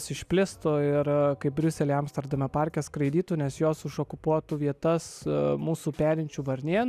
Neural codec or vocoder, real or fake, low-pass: none; real; 14.4 kHz